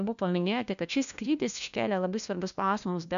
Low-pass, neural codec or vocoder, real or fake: 7.2 kHz; codec, 16 kHz, 1 kbps, FunCodec, trained on LibriTTS, 50 frames a second; fake